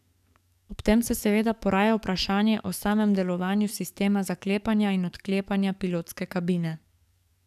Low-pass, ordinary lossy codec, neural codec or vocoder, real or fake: 14.4 kHz; none; codec, 44.1 kHz, 7.8 kbps, DAC; fake